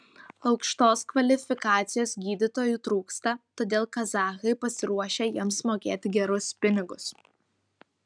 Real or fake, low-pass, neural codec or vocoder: real; 9.9 kHz; none